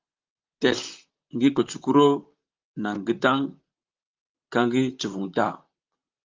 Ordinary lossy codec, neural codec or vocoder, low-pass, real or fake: Opus, 24 kbps; vocoder, 44.1 kHz, 128 mel bands, Pupu-Vocoder; 7.2 kHz; fake